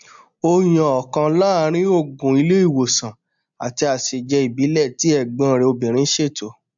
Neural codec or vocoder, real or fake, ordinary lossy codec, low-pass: none; real; MP3, 64 kbps; 7.2 kHz